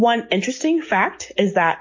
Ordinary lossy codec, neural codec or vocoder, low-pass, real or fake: MP3, 32 kbps; none; 7.2 kHz; real